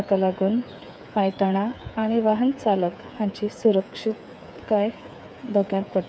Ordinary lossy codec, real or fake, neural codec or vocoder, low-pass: none; fake; codec, 16 kHz, 8 kbps, FreqCodec, smaller model; none